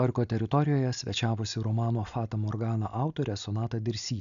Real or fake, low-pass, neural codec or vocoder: real; 7.2 kHz; none